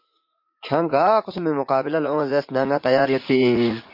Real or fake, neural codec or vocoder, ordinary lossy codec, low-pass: fake; vocoder, 44.1 kHz, 80 mel bands, Vocos; MP3, 32 kbps; 5.4 kHz